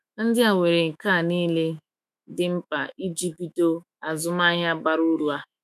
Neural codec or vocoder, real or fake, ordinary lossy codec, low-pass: autoencoder, 48 kHz, 128 numbers a frame, DAC-VAE, trained on Japanese speech; fake; none; 14.4 kHz